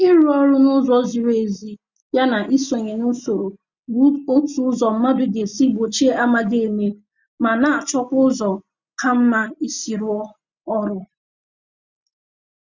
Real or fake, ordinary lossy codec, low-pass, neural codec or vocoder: real; Opus, 64 kbps; 7.2 kHz; none